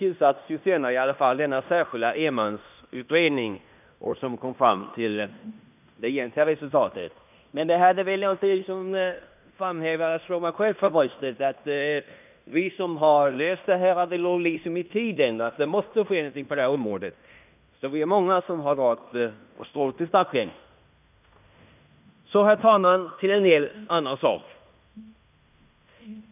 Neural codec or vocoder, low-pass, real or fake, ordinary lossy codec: codec, 16 kHz in and 24 kHz out, 0.9 kbps, LongCat-Audio-Codec, fine tuned four codebook decoder; 3.6 kHz; fake; none